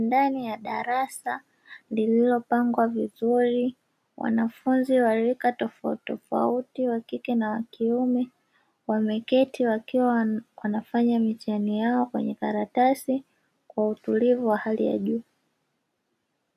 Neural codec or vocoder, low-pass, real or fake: none; 14.4 kHz; real